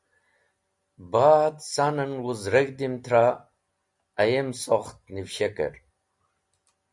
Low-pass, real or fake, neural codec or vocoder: 10.8 kHz; real; none